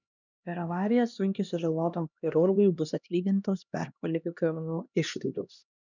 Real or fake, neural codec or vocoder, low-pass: fake; codec, 16 kHz, 1 kbps, X-Codec, HuBERT features, trained on LibriSpeech; 7.2 kHz